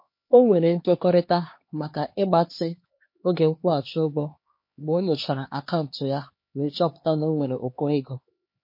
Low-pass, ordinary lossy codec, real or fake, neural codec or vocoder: 5.4 kHz; MP3, 32 kbps; fake; codec, 16 kHz, 2 kbps, X-Codec, HuBERT features, trained on LibriSpeech